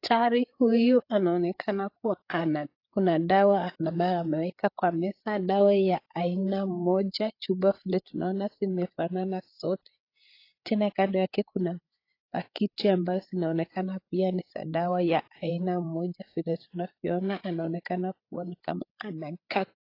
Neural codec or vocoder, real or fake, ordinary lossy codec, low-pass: codec, 16 kHz, 8 kbps, FreqCodec, larger model; fake; AAC, 32 kbps; 5.4 kHz